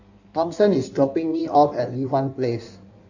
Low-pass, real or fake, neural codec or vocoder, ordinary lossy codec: 7.2 kHz; fake; codec, 16 kHz in and 24 kHz out, 1.1 kbps, FireRedTTS-2 codec; none